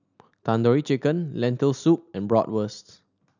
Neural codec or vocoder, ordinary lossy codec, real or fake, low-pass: none; none; real; 7.2 kHz